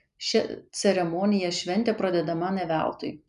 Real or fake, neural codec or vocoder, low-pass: real; none; 10.8 kHz